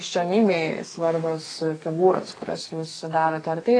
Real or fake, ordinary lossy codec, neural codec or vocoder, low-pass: fake; AAC, 32 kbps; codec, 32 kHz, 1.9 kbps, SNAC; 9.9 kHz